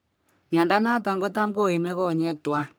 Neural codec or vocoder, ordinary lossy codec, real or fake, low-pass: codec, 44.1 kHz, 3.4 kbps, Pupu-Codec; none; fake; none